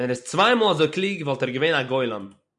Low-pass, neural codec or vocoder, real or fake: 10.8 kHz; none; real